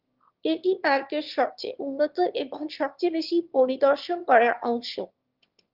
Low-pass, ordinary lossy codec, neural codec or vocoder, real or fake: 5.4 kHz; Opus, 32 kbps; autoencoder, 22.05 kHz, a latent of 192 numbers a frame, VITS, trained on one speaker; fake